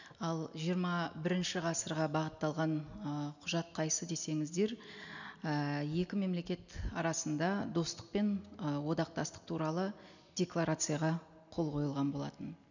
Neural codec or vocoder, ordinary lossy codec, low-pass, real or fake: none; none; 7.2 kHz; real